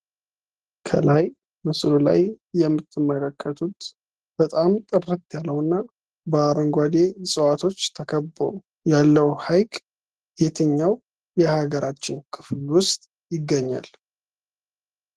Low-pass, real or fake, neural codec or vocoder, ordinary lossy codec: 10.8 kHz; real; none; Opus, 16 kbps